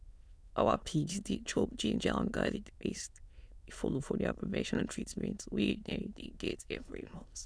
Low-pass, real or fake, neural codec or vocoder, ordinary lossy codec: none; fake; autoencoder, 22.05 kHz, a latent of 192 numbers a frame, VITS, trained on many speakers; none